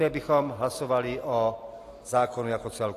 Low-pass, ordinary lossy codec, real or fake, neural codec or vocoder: 14.4 kHz; AAC, 48 kbps; real; none